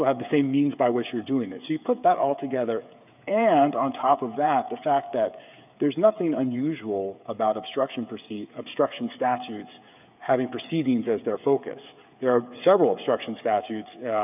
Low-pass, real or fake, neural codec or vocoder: 3.6 kHz; fake; codec, 16 kHz, 8 kbps, FreqCodec, smaller model